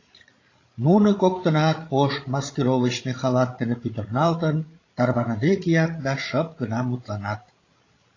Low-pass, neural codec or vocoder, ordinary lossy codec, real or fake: 7.2 kHz; codec, 16 kHz, 16 kbps, FreqCodec, larger model; AAC, 32 kbps; fake